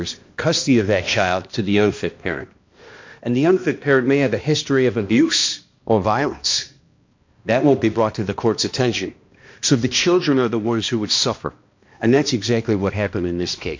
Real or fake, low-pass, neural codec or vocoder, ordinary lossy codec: fake; 7.2 kHz; codec, 16 kHz, 1 kbps, X-Codec, HuBERT features, trained on balanced general audio; MP3, 48 kbps